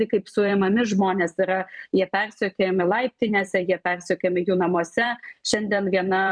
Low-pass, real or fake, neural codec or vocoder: 9.9 kHz; fake; vocoder, 44.1 kHz, 128 mel bands every 256 samples, BigVGAN v2